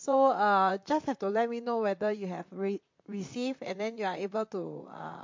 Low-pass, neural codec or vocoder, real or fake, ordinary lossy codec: 7.2 kHz; vocoder, 44.1 kHz, 128 mel bands, Pupu-Vocoder; fake; MP3, 48 kbps